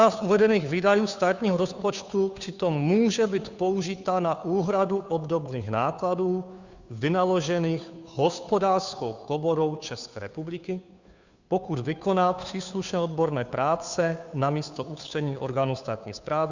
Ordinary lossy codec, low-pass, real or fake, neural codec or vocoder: Opus, 64 kbps; 7.2 kHz; fake; codec, 16 kHz, 2 kbps, FunCodec, trained on Chinese and English, 25 frames a second